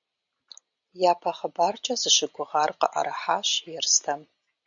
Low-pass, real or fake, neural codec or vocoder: 7.2 kHz; real; none